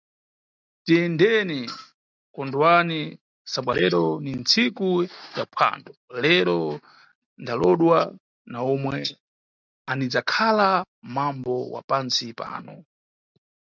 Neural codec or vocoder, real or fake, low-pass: none; real; 7.2 kHz